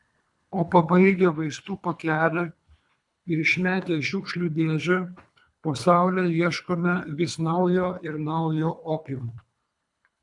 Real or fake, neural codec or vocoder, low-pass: fake; codec, 24 kHz, 3 kbps, HILCodec; 10.8 kHz